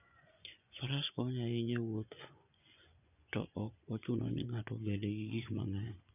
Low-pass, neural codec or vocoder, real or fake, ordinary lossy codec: 3.6 kHz; vocoder, 24 kHz, 100 mel bands, Vocos; fake; none